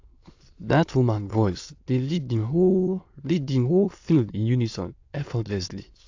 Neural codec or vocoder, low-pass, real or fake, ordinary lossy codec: autoencoder, 22.05 kHz, a latent of 192 numbers a frame, VITS, trained on many speakers; 7.2 kHz; fake; AAC, 48 kbps